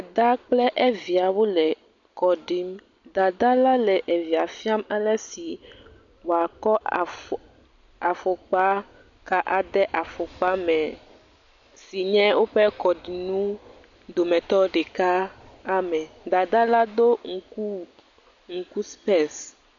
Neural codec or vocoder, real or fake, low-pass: none; real; 7.2 kHz